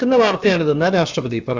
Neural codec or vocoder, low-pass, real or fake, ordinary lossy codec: codec, 16 kHz, about 1 kbps, DyCAST, with the encoder's durations; 7.2 kHz; fake; Opus, 32 kbps